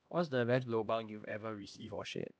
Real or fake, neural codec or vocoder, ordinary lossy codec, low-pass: fake; codec, 16 kHz, 1 kbps, X-Codec, HuBERT features, trained on LibriSpeech; none; none